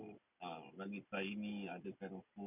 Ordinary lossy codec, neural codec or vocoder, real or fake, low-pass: none; none; real; 3.6 kHz